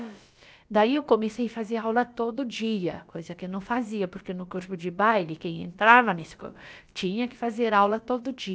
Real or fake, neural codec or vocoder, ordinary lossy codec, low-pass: fake; codec, 16 kHz, about 1 kbps, DyCAST, with the encoder's durations; none; none